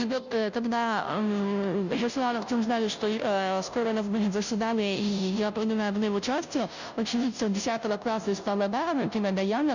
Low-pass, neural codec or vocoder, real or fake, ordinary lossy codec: 7.2 kHz; codec, 16 kHz, 0.5 kbps, FunCodec, trained on Chinese and English, 25 frames a second; fake; none